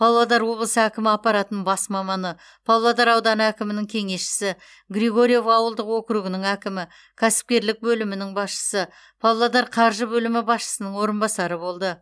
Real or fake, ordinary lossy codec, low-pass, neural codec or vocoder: real; none; none; none